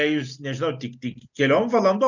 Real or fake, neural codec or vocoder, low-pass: real; none; 7.2 kHz